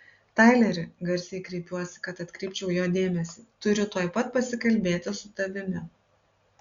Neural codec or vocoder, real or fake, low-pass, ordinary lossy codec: none; real; 7.2 kHz; Opus, 64 kbps